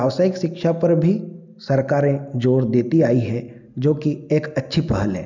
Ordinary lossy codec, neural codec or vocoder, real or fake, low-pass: none; none; real; 7.2 kHz